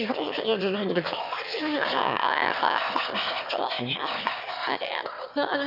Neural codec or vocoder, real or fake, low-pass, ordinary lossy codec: autoencoder, 22.05 kHz, a latent of 192 numbers a frame, VITS, trained on one speaker; fake; 5.4 kHz; none